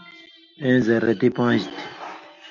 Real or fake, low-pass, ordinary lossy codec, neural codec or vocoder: real; 7.2 kHz; MP3, 64 kbps; none